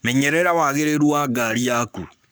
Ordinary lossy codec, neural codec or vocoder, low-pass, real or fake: none; codec, 44.1 kHz, 7.8 kbps, Pupu-Codec; none; fake